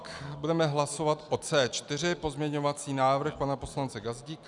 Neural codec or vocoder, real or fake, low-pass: none; real; 10.8 kHz